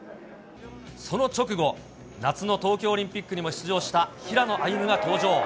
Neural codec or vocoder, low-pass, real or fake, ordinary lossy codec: none; none; real; none